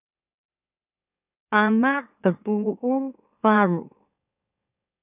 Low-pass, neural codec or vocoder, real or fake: 3.6 kHz; autoencoder, 44.1 kHz, a latent of 192 numbers a frame, MeloTTS; fake